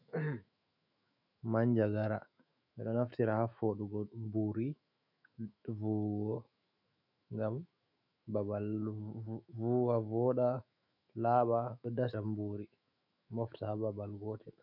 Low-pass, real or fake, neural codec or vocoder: 5.4 kHz; fake; autoencoder, 48 kHz, 128 numbers a frame, DAC-VAE, trained on Japanese speech